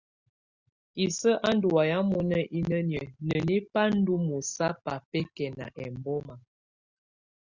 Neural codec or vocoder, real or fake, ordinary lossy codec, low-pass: none; real; Opus, 64 kbps; 7.2 kHz